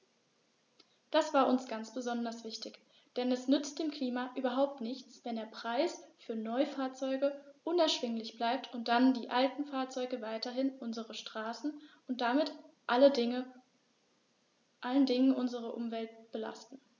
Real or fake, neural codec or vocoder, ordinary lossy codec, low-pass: real; none; none; 7.2 kHz